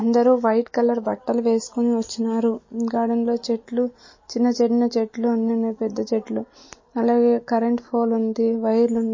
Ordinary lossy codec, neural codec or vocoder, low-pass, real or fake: MP3, 32 kbps; none; 7.2 kHz; real